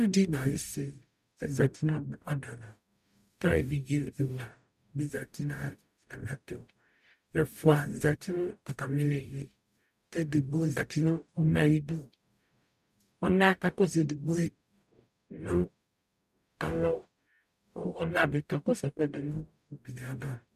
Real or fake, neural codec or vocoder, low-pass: fake; codec, 44.1 kHz, 0.9 kbps, DAC; 14.4 kHz